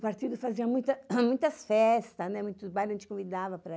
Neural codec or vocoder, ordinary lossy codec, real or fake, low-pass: none; none; real; none